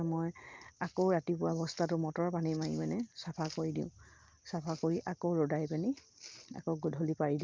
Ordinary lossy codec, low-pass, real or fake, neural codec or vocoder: Opus, 24 kbps; 7.2 kHz; real; none